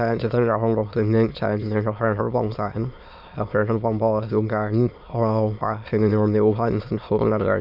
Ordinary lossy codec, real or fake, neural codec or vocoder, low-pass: none; fake; autoencoder, 22.05 kHz, a latent of 192 numbers a frame, VITS, trained on many speakers; 5.4 kHz